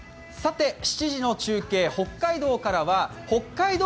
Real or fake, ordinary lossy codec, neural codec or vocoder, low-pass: real; none; none; none